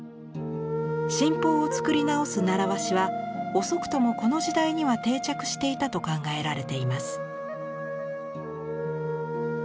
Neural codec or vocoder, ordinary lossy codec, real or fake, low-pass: none; none; real; none